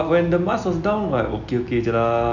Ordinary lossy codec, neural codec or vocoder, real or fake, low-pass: none; none; real; 7.2 kHz